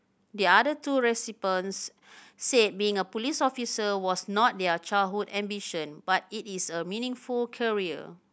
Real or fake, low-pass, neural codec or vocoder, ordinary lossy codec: real; none; none; none